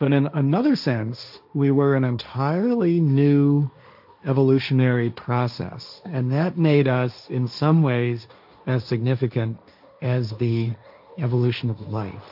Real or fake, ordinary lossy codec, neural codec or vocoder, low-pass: fake; AAC, 48 kbps; codec, 16 kHz, 1.1 kbps, Voila-Tokenizer; 5.4 kHz